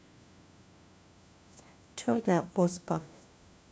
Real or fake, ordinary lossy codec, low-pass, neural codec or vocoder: fake; none; none; codec, 16 kHz, 1 kbps, FunCodec, trained on LibriTTS, 50 frames a second